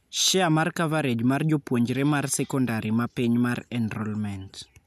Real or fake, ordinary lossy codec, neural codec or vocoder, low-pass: real; none; none; 14.4 kHz